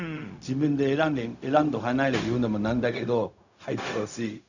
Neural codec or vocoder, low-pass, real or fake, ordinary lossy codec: codec, 16 kHz, 0.4 kbps, LongCat-Audio-Codec; 7.2 kHz; fake; none